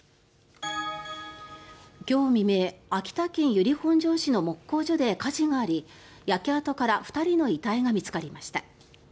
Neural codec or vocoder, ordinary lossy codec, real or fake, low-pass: none; none; real; none